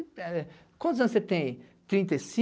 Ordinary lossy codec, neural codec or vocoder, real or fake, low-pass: none; none; real; none